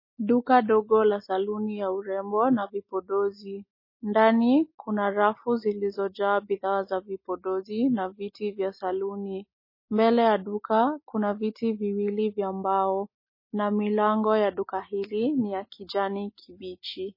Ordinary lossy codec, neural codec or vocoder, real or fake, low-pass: MP3, 24 kbps; none; real; 5.4 kHz